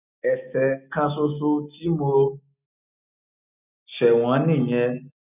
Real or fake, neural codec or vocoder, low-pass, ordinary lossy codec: real; none; 3.6 kHz; none